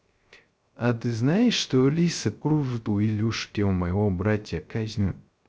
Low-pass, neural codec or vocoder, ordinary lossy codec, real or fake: none; codec, 16 kHz, 0.3 kbps, FocalCodec; none; fake